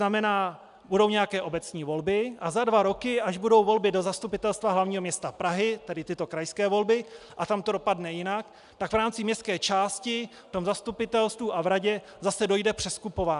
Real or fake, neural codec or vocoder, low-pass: real; none; 10.8 kHz